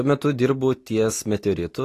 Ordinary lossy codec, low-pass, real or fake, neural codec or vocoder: AAC, 48 kbps; 14.4 kHz; real; none